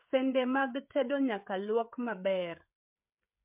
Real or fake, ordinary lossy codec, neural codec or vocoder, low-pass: fake; MP3, 32 kbps; codec, 16 kHz, 16 kbps, FreqCodec, smaller model; 3.6 kHz